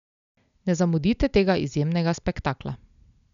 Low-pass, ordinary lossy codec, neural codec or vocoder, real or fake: 7.2 kHz; none; none; real